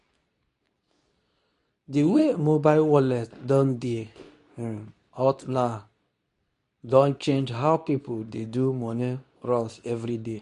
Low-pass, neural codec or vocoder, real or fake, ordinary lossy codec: 10.8 kHz; codec, 24 kHz, 0.9 kbps, WavTokenizer, medium speech release version 2; fake; none